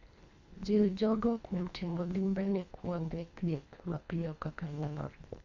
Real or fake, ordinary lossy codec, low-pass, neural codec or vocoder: fake; none; 7.2 kHz; codec, 24 kHz, 1.5 kbps, HILCodec